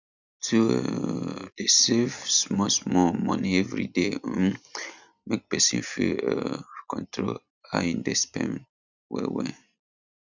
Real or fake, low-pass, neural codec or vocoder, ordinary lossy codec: real; 7.2 kHz; none; none